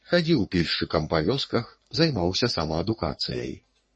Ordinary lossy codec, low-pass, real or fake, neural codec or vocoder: MP3, 32 kbps; 10.8 kHz; fake; codec, 44.1 kHz, 3.4 kbps, Pupu-Codec